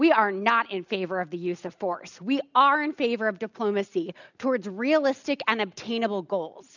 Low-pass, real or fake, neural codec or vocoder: 7.2 kHz; real; none